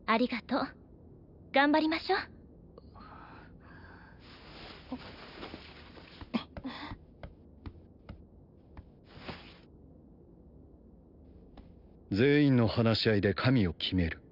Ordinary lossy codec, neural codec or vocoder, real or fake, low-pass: none; none; real; 5.4 kHz